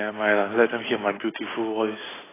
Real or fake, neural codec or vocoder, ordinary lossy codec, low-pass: real; none; AAC, 16 kbps; 3.6 kHz